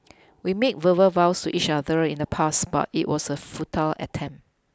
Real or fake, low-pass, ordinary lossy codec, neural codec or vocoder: real; none; none; none